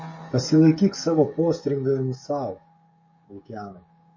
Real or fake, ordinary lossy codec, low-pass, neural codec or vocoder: fake; MP3, 32 kbps; 7.2 kHz; codec, 16 kHz, 16 kbps, FreqCodec, smaller model